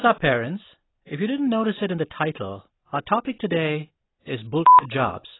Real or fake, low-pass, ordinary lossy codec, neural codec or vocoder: real; 7.2 kHz; AAC, 16 kbps; none